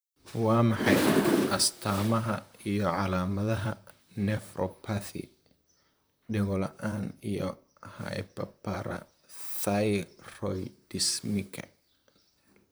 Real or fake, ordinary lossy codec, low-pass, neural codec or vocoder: fake; none; none; vocoder, 44.1 kHz, 128 mel bands, Pupu-Vocoder